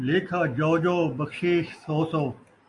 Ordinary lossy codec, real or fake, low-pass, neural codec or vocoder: AAC, 64 kbps; real; 10.8 kHz; none